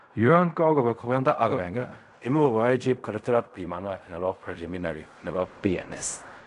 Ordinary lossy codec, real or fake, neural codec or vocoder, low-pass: none; fake; codec, 16 kHz in and 24 kHz out, 0.4 kbps, LongCat-Audio-Codec, fine tuned four codebook decoder; 10.8 kHz